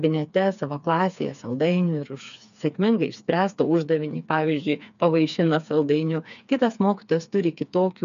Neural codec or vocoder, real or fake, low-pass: codec, 16 kHz, 4 kbps, FreqCodec, smaller model; fake; 7.2 kHz